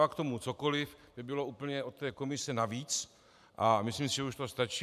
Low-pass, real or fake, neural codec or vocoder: 14.4 kHz; fake; vocoder, 44.1 kHz, 128 mel bands every 512 samples, BigVGAN v2